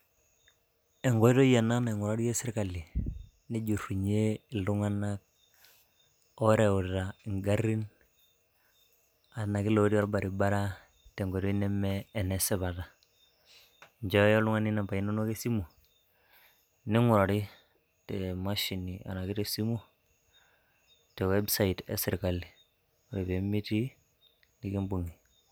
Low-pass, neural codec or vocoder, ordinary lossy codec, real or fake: none; none; none; real